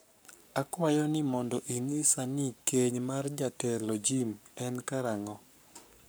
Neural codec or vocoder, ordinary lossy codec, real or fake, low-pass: codec, 44.1 kHz, 7.8 kbps, Pupu-Codec; none; fake; none